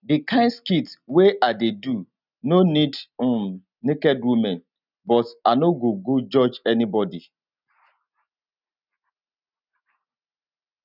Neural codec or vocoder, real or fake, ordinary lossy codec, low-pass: none; real; none; 5.4 kHz